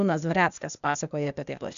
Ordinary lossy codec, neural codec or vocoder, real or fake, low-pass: MP3, 96 kbps; codec, 16 kHz, 0.8 kbps, ZipCodec; fake; 7.2 kHz